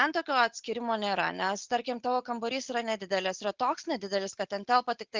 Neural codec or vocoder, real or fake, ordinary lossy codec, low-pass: none; real; Opus, 24 kbps; 7.2 kHz